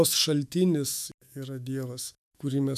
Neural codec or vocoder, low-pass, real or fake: autoencoder, 48 kHz, 128 numbers a frame, DAC-VAE, trained on Japanese speech; 14.4 kHz; fake